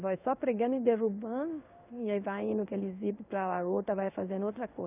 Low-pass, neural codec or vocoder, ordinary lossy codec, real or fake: 3.6 kHz; codec, 16 kHz in and 24 kHz out, 1 kbps, XY-Tokenizer; none; fake